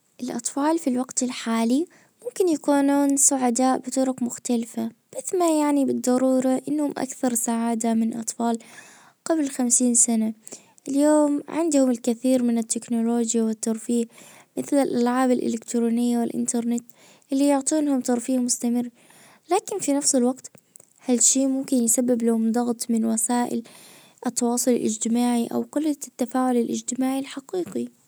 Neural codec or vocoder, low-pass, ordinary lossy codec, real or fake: none; none; none; real